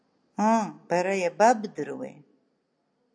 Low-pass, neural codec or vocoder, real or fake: 9.9 kHz; none; real